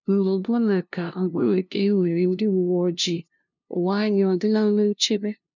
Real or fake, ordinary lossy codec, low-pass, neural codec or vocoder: fake; none; 7.2 kHz; codec, 16 kHz, 0.5 kbps, FunCodec, trained on LibriTTS, 25 frames a second